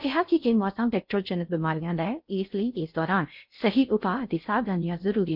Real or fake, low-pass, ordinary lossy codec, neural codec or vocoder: fake; 5.4 kHz; none; codec, 16 kHz in and 24 kHz out, 0.6 kbps, FocalCodec, streaming, 4096 codes